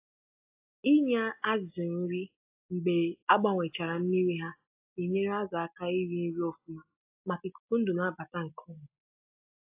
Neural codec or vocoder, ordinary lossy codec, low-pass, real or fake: none; AAC, 24 kbps; 3.6 kHz; real